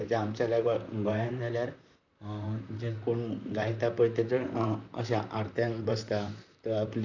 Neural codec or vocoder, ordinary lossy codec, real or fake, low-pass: vocoder, 44.1 kHz, 128 mel bands, Pupu-Vocoder; none; fake; 7.2 kHz